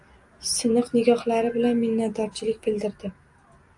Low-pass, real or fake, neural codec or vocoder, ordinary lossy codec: 10.8 kHz; real; none; AAC, 64 kbps